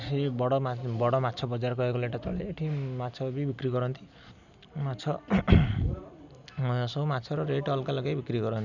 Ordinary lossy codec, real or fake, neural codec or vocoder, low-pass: none; real; none; 7.2 kHz